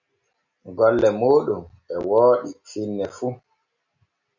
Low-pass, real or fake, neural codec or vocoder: 7.2 kHz; real; none